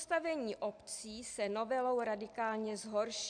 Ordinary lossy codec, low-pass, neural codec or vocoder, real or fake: AAC, 64 kbps; 9.9 kHz; none; real